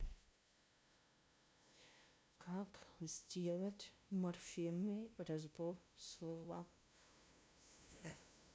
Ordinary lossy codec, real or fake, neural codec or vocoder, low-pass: none; fake; codec, 16 kHz, 0.5 kbps, FunCodec, trained on LibriTTS, 25 frames a second; none